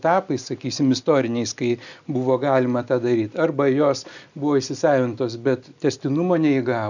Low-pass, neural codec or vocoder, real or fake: 7.2 kHz; none; real